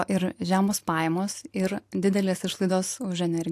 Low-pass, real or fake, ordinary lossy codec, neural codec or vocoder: 14.4 kHz; real; AAC, 64 kbps; none